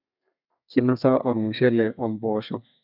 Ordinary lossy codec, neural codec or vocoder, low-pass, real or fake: none; codec, 32 kHz, 1.9 kbps, SNAC; 5.4 kHz; fake